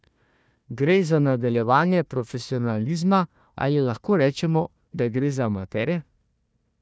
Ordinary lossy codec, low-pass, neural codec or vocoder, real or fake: none; none; codec, 16 kHz, 1 kbps, FunCodec, trained on Chinese and English, 50 frames a second; fake